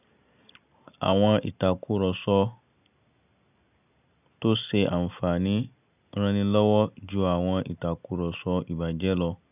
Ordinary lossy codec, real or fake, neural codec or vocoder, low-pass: none; real; none; 3.6 kHz